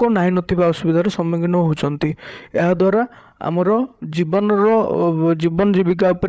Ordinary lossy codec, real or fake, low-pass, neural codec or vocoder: none; fake; none; codec, 16 kHz, 8 kbps, FreqCodec, larger model